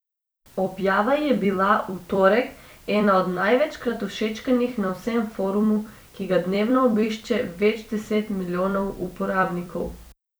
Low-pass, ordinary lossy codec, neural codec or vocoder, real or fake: none; none; vocoder, 44.1 kHz, 128 mel bands every 256 samples, BigVGAN v2; fake